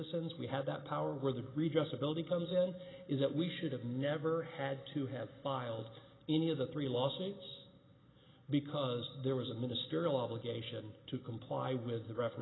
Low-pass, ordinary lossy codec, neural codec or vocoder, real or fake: 7.2 kHz; AAC, 16 kbps; none; real